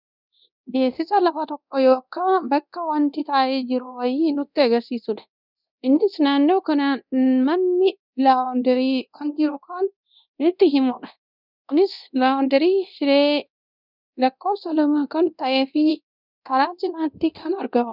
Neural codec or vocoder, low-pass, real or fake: codec, 24 kHz, 0.9 kbps, DualCodec; 5.4 kHz; fake